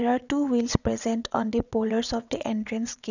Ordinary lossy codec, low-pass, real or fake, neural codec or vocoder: none; 7.2 kHz; fake; vocoder, 22.05 kHz, 80 mel bands, WaveNeXt